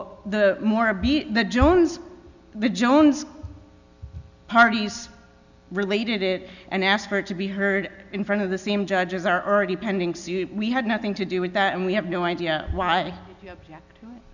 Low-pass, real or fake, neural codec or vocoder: 7.2 kHz; real; none